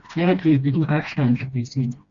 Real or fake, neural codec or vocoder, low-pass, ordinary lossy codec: fake; codec, 16 kHz, 1 kbps, FreqCodec, smaller model; 7.2 kHz; Opus, 64 kbps